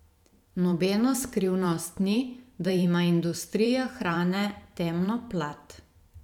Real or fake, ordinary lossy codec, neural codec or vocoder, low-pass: fake; none; vocoder, 44.1 kHz, 128 mel bands every 512 samples, BigVGAN v2; 19.8 kHz